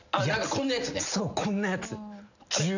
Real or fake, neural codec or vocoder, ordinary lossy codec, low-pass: real; none; none; 7.2 kHz